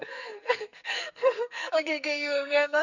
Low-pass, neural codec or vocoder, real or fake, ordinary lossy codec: 7.2 kHz; codec, 44.1 kHz, 2.6 kbps, SNAC; fake; none